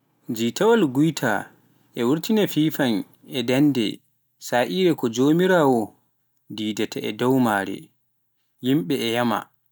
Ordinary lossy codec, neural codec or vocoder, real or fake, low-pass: none; none; real; none